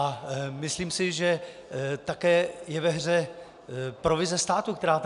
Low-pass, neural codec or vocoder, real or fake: 10.8 kHz; none; real